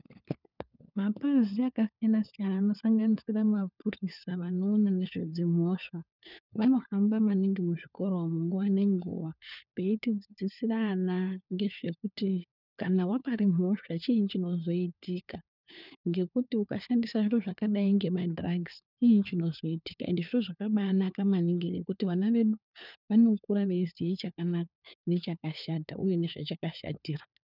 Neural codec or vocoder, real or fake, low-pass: codec, 16 kHz, 4 kbps, FunCodec, trained on LibriTTS, 50 frames a second; fake; 5.4 kHz